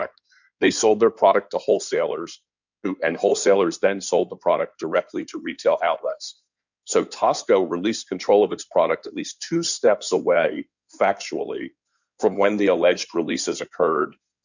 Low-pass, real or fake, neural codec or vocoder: 7.2 kHz; fake; codec, 16 kHz in and 24 kHz out, 2.2 kbps, FireRedTTS-2 codec